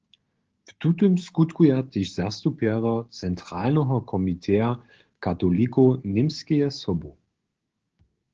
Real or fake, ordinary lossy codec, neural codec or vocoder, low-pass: fake; Opus, 16 kbps; codec, 16 kHz, 6 kbps, DAC; 7.2 kHz